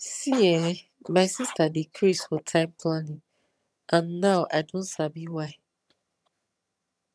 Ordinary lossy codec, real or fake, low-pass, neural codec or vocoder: none; fake; none; vocoder, 22.05 kHz, 80 mel bands, HiFi-GAN